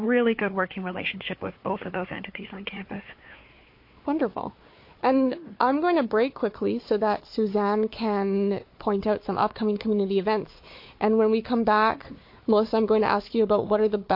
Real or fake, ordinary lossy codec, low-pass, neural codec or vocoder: fake; MP3, 32 kbps; 5.4 kHz; codec, 16 kHz, 4 kbps, FunCodec, trained on Chinese and English, 50 frames a second